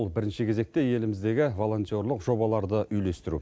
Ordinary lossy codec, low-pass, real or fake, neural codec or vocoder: none; none; real; none